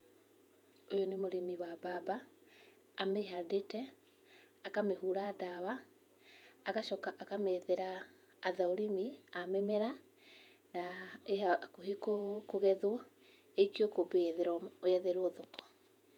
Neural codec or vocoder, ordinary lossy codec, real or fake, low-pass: vocoder, 48 kHz, 128 mel bands, Vocos; none; fake; 19.8 kHz